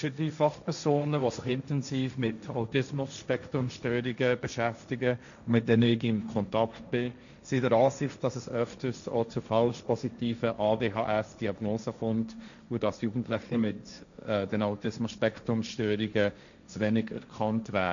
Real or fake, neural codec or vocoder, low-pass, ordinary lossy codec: fake; codec, 16 kHz, 1.1 kbps, Voila-Tokenizer; 7.2 kHz; none